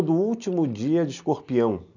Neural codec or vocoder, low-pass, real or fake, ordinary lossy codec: none; 7.2 kHz; real; none